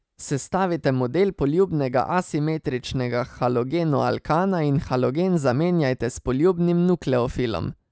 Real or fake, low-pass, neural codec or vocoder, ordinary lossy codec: real; none; none; none